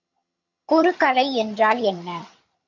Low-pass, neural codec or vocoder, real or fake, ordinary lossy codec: 7.2 kHz; vocoder, 22.05 kHz, 80 mel bands, HiFi-GAN; fake; AAC, 48 kbps